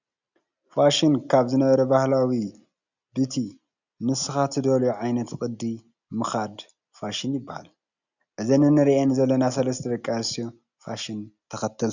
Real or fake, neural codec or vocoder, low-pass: real; none; 7.2 kHz